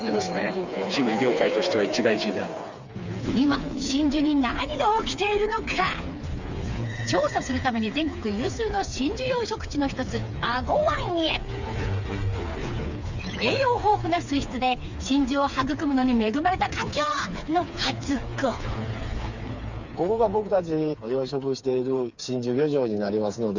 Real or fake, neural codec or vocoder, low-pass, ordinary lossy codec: fake; codec, 16 kHz, 4 kbps, FreqCodec, smaller model; 7.2 kHz; Opus, 64 kbps